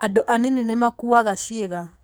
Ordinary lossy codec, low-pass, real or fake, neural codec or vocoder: none; none; fake; codec, 44.1 kHz, 2.6 kbps, SNAC